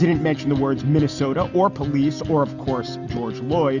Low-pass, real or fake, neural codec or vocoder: 7.2 kHz; real; none